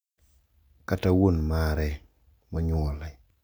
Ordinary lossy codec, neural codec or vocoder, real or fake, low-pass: none; none; real; none